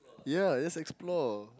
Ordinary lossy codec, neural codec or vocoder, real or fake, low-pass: none; none; real; none